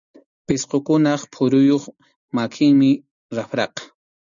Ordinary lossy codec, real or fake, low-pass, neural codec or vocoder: MP3, 96 kbps; real; 7.2 kHz; none